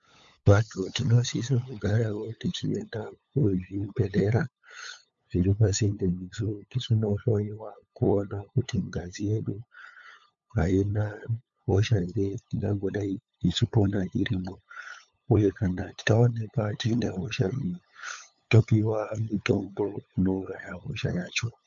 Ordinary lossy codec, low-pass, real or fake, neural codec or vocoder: MP3, 64 kbps; 7.2 kHz; fake; codec, 16 kHz, 8 kbps, FunCodec, trained on LibriTTS, 25 frames a second